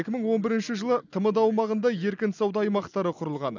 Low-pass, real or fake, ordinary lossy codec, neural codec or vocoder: 7.2 kHz; real; none; none